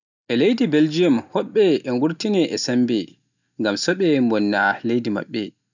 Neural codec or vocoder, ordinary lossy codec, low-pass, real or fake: none; none; 7.2 kHz; real